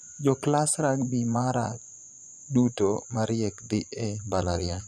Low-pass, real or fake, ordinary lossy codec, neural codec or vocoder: none; fake; none; vocoder, 24 kHz, 100 mel bands, Vocos